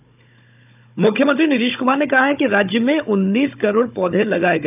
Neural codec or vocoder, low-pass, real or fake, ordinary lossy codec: codec, 16 kHz, 16 kbps, FunCodec, trained on Chinese and English, 50 frames a second; 3.6 kHz; fake; none